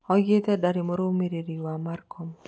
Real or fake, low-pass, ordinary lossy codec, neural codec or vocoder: real; none; none; none